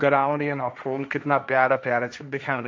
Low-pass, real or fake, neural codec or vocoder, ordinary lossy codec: none; fake; codec, 16 kHz, 1.1 kbps, Voila-Tokenizer; none